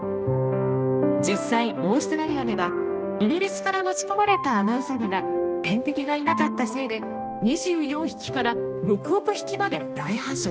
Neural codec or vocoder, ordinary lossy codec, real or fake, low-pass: codec, 16 kHz, 1 kbps, X-Codec, HuBERT features, trained on balanced general audio; none; fake; none